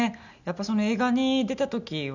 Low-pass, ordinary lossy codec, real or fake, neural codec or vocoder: 7.2 kHz; none; real; none